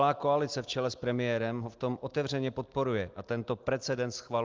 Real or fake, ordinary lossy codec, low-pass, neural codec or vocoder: real; Opus, 32 kbps; 7.2 kHz; none